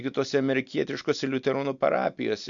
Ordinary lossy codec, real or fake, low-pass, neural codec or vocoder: MP3, 64 kbps; real; 7.2 kHz; none